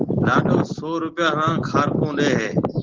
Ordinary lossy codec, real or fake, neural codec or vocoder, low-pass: Opus, 16 kbps; real; none; 7.2 kHz